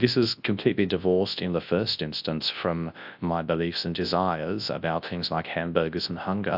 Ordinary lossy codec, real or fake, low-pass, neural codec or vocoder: AAC, 48 kbps; fake; 5.4 kHz; codec, 24 kHz, 0.9 kbps, WavTokenizer, large speech release